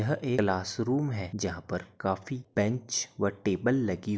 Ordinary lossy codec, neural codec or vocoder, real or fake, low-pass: none; none; real; none